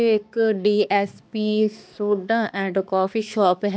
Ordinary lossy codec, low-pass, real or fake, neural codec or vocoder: none; none; fake; codec, 16 kHz, 2 kbps, X-Codec, HuBERT features, trained on balanced general audio